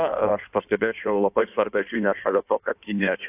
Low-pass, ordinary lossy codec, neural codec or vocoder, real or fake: 3.6 kHz; AAC, 32 kbps; codec, 16 kHz in and 24 kHz out, 1.1 kbps, FireRedTTS-2 codec; fake